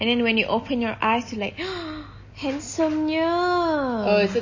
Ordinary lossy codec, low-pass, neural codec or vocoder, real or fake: MP3, 32 kbps; 7.2 kHz; none; real